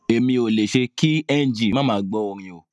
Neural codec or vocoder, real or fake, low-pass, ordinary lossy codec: none; real; none; none